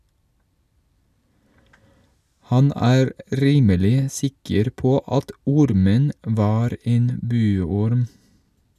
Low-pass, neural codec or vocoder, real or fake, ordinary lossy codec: 14.4 kHz; none; real; none